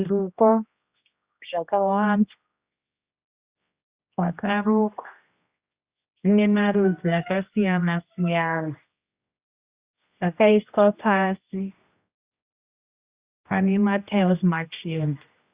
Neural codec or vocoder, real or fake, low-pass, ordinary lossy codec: codec, 16 kHz, 1 kbps, X-Codec, HuBERT features, trained on general audio; fake; 3.6 kHz; Opus, 64 kbps